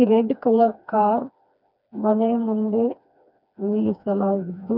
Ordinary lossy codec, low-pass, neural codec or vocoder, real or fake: none; 5.4 kHz; codec, 16 kHz, 2 kbps, FreqCodec, smaller model; fake